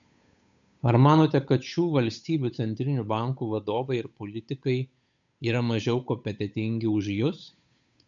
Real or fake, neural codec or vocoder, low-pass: fake; codec, 16 kHz, 8 kbps, FunCodec, trained on Chinese and English, 25 frames a second; 7.2 kHz